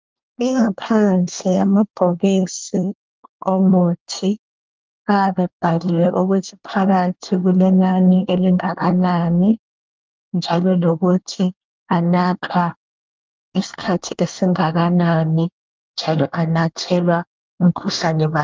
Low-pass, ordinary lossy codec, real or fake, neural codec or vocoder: 7.2 kHz; Opus, 24 kbps; fake; codec, 24 kHz, 1 kbps, SNAC